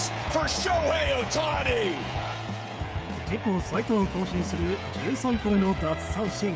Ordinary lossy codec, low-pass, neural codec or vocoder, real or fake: none; none; codec, 16 kHz, 16 kbps, FreqCodec, smaller model; fake